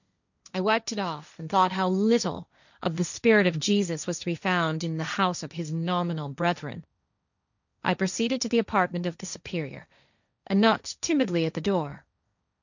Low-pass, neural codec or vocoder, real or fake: 7.2 kHz; codec, 16 kHz, 1.1 kbps, Voila-Tokenizer; fake